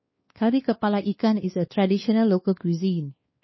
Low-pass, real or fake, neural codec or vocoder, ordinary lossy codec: 7.2 kHz; fake; codec, 16 kHz, 2 kbps, X-Codec, WavLM features, trained on Multilingual LibriSpeech; MP3, 24 kbps